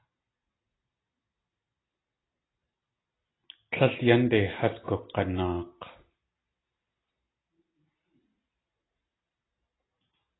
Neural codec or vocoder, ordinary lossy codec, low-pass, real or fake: none; AAC, 16 kbps; 7.2 kHz; real